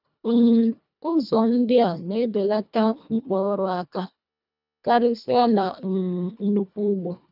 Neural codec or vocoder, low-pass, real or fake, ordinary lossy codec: codec, 24 kHz, 1.5 kbps, HILCodec; 5.4 kHz; fake; none